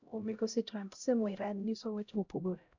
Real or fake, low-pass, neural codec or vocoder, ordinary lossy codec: fake; 7.2 kHz; codec, 16 kHz, 0.5 kbps, X-Codec, HuBERT features, trained on LibriSpeech; MP3, 64 kbps